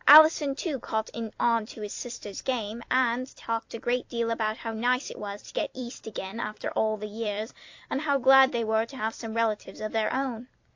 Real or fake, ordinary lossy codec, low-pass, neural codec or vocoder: fake; AAC, 48 kbps; 7.2 kHz; vocoder, 44.1 kHz, 128 mel bands every 256 samples, BigVGAN v2